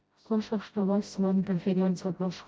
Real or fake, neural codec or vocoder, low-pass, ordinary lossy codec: fake; codec, 16 kHz, 0.5 kbps, FreqCodec, smaller model; none; none